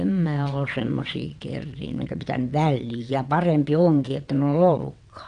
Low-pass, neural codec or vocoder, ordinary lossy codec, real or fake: 9.9 kHz; vocoder, 22.05 kHz, 80 mel bands, WaveNeXt; MP3, 96 kbps; fake